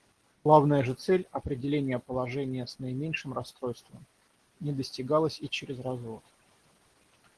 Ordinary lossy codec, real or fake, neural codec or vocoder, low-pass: Opus, 16 kbps; real; none; 10.8 kHz